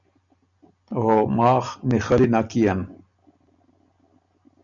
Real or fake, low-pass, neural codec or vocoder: real; 7.2 kHz; none